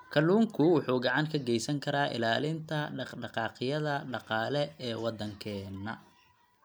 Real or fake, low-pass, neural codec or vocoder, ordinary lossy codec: real; none; none; none